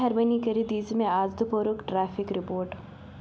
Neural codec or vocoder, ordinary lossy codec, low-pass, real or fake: none; none; none; real